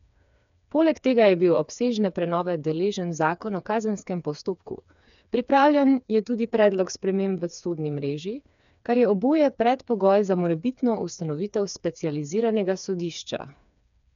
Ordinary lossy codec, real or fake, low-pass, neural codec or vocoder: none; fake; 7.2 kHz; codec, 16 kHz, 4 kbps, FreqCodec, smaller model